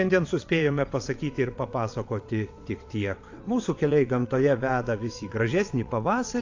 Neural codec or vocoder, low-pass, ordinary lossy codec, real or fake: vocoder, 22.05 kHz, 80 mel bands, WaveNeXt; 7.2 kHz; AAC, 48 kbps; fake